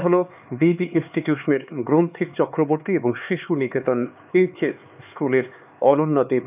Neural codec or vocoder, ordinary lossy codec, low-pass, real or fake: codec, 16 kHz, 4 kbps, X-Codec, HuBERT features, trained on LibriSpeech; none; 3.6 kHz; fake